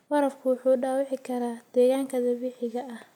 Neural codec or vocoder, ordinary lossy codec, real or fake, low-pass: none; none; real; 19.8 kHz